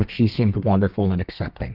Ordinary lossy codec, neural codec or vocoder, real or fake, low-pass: Opus, 32 kbps; codec, 32 kHz, 1.9 kbps, SNAC; fake; 5.4 kHz